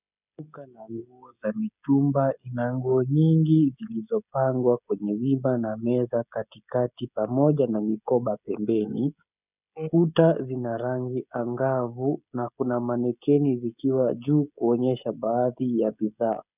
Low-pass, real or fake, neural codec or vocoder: 3.6 kHz; fake; codec, 16 kHz, 16 kbps, FreqCodec, smaller model